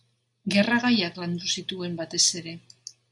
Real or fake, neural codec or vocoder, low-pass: real; none; 10.8 kHz